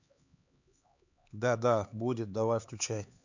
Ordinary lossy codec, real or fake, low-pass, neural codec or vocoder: none; fake; 7.2 kHz; codec, 16 kHz, 4 kbps, X-Codec, HuBERT features, trained on LibriSpeech